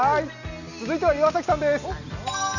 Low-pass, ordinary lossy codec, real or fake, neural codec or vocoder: 7.2 kHz; none; real; none